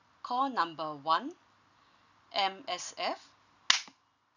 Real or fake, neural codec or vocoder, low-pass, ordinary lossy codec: real; none; 7.2 kHz; none